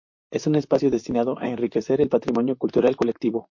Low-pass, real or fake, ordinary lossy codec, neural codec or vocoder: 7.2 kHz; fake; MP3, 48 kbps; vocoder, 44.1 kHz, 128 mel bands, Pupu-Vocoder